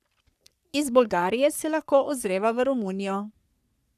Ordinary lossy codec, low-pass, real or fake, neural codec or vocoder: none; 14.4 kHz; fake; codec, 44.1 kHz, 3.4 kbps, Pupu-Codec